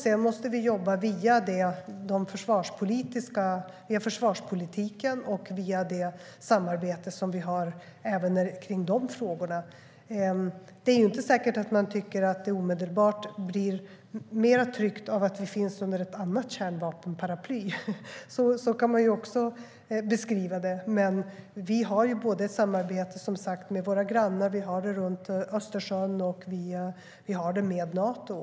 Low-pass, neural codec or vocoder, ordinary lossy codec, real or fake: none; none; none; real